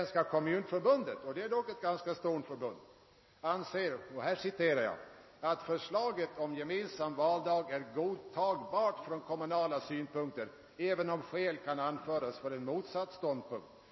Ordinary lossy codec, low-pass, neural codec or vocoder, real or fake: MP3, 24 kbps; 7.2 kHz; none; real